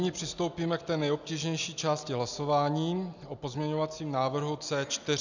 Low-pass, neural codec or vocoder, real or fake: 7.2 kHz; none; real